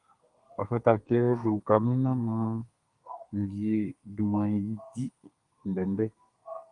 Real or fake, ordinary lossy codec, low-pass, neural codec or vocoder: fake; Opus, 32 kbps; 10.8 kHz; codec, 32 kHz, 1.9 kbps, SNAC